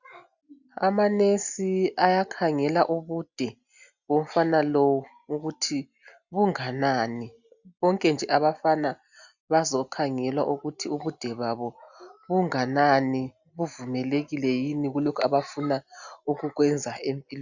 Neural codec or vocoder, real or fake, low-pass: none; real; 7.2 kHz